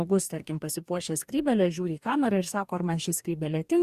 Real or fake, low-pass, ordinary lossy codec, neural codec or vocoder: fake; 14.4 kHz; Opus, 64 kbps; codec, 44.1 kHz, 2.6 kbps, DAC